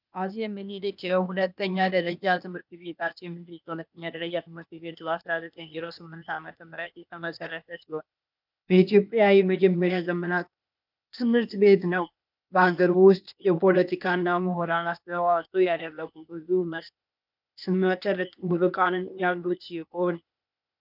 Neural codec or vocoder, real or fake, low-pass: codec, 16 kHz, 0.8 kbps, ZipCodec; fake; 5.4 kHz